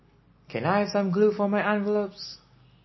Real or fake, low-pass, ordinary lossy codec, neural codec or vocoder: real; 7.2 kHz; MP3, 24 kbps; none